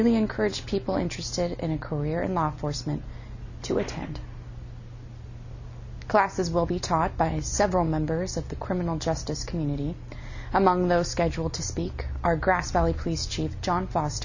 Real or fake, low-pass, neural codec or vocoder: real; 7.2 kHz; none